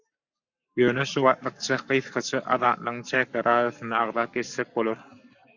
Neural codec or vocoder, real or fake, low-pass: codec, 44.1 kHz, 7.8 kbps, Pupu-Codec; fake; 7.2 kHz